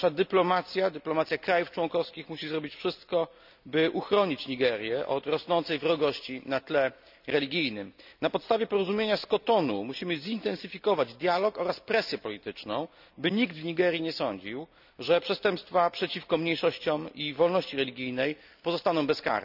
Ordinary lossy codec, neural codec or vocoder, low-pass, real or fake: none; none; 5.4 kHz; real